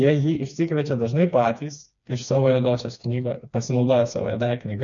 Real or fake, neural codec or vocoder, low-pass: fake; codec, 16 kHz, 2 kbps, FreqCodec, smaller model; 7.2 kHz